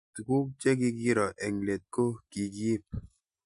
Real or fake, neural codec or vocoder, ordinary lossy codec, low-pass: real; none; MP3, 96 kbps; 10.8 kHz